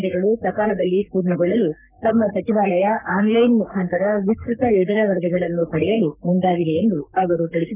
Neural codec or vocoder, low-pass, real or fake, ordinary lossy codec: codec, 44.1 kHz, 3.4 kbps, Pupu-Codec; 3.6 kHz; fake; none